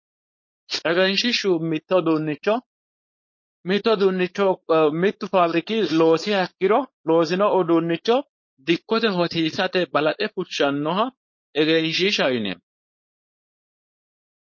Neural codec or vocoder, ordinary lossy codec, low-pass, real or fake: codec, 16 kHz, 4.8 kbps, FACodec; MP3, 32 kbps; 7.2 kHz; fake